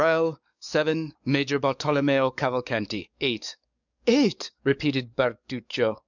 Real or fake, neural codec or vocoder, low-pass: fake; codec, 44.1 kHz, 7.8 kbps, DAC; 7.2 kHz